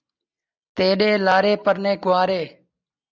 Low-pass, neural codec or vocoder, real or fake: 7.2 kHz; none; real